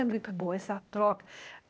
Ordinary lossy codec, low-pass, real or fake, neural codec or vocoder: none; none; fake; codec, 16 kHz, 0.8 kbps, ZipCodec